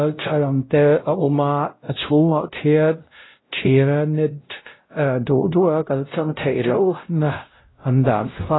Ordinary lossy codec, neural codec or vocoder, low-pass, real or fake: AAC, 16 kbps; codec, 16 kHz, 0.5 kbps, X-Codec, WavLM features, trained on Multilingual LibriSpeech; 7.2 kHz; fake